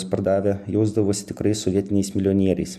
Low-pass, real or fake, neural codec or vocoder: 14.4 kHz; real; none